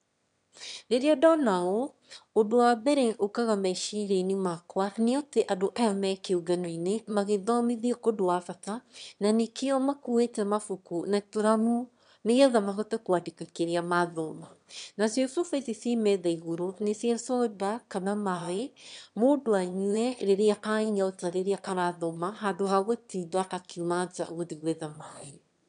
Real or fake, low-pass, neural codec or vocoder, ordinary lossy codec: fake; 9.9 kHz; autoencoder, 22.05 kHz, a latent of 192 numbers a frame, VITS, trained on one speaker; none